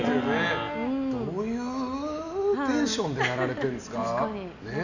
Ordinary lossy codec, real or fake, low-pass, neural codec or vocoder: none; real; 7.2 kHz; none